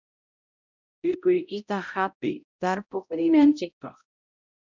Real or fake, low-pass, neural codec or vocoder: fake; 7.2 kHz; codec, 16 kHz, 0.5 kbps, X-Codec, HuBERT features, trained on balanced general audio